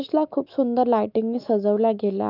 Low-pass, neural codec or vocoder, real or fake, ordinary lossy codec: 5.4 kHz; autoencoder, 48 kHz, 128 numbers a frame, DAC-VAE, trained on Japanese speech; fake; Opus, 24 kbps